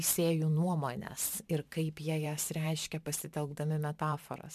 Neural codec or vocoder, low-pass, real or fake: vocoder, 44.1 kHz, 128 mel bands every 512 samples, BigVGAN v2; 14.4 kHz; fake